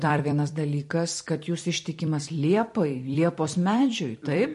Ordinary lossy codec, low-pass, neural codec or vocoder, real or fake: MP3, 48 kbps; 14.4 kHz; vocoder, 44.1 kHz, 128 mel bands every 256 samples, BigVGAN v2; fake